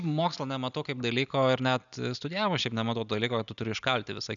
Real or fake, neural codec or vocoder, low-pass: real; none; 7.2 kHz